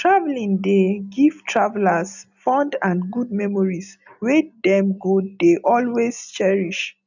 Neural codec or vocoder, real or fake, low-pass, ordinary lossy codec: none; real; 7.2 kHz; none